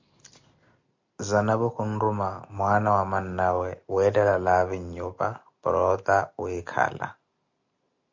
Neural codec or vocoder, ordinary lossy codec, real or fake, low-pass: none; MP3, 64 kbps; real; 7.2 kHz